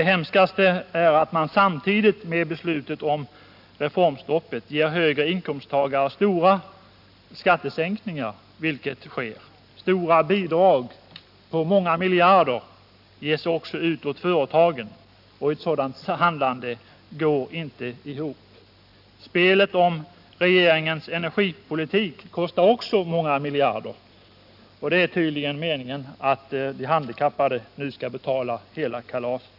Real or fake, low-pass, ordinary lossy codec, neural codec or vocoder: real; 5.4 kHz; AAC, 48 kbps; none